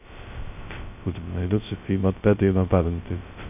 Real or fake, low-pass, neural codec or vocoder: fake; 3.6 kHz; codec, 16 kHz, 0.2 kbps, FocalCodec